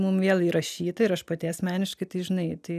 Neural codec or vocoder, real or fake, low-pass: none; real; 14.4 kHz